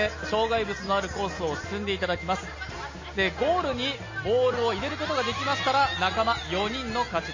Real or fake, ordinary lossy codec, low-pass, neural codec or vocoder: real; MP3, 32 kbps; 7.2 kHz; none